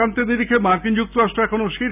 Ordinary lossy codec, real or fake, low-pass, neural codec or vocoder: none; real; 3.6 kHz; none